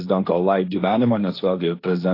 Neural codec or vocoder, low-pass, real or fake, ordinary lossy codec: codec, 16 kHz, 1.1 kbps, Voila-Tokenizer; 5.4 kHz; fake; AAC, 32 kbps